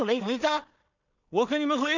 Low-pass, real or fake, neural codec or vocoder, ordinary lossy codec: 7.2 kHz; fake; codec, 16 kHz in and 24 kHz out, 0.4 kbps, LongCat-Audio-Codec, two codebook decoder; none